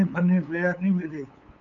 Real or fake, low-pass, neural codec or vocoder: fake; 7.2 kHz; codec, 16 kHz, 8 kbps, FunCodec, trained on LibriTTS, 25 frames a second